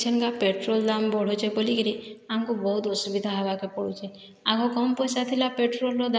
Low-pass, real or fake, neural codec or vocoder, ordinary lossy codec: none; real; none; none